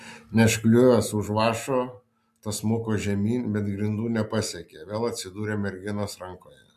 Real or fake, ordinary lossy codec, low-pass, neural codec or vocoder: real; MP3, 96 kbps; 14.4 kHz; none